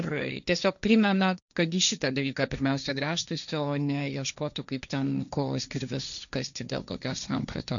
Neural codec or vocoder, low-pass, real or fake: codec, 16 kHz, 1.1 kbps, Voila-Tokenizer; 7.2 kHz; fake